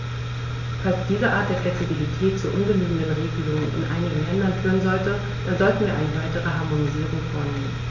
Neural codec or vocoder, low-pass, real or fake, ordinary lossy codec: none; 7.2 kHz; real; none